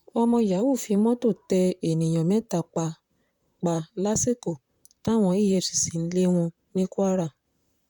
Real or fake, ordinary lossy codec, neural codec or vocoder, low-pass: fake; none; vocoder, 44.1 kHz, 128 mel bands, Pupu-Vocoder; 19.8 kHz